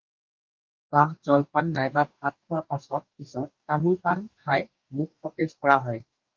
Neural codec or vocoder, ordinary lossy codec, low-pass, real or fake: codec, 44.1 kHz, 2.6 kbps, DAC; Opus, 32 kbps; 7.2 kHz; fake